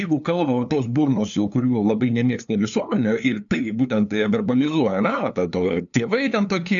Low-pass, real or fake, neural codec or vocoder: 7.2 kHz; fake; codec, 16 kHz, 2 kbps, FunCodec, trained on LibriTTS, 25 frames a second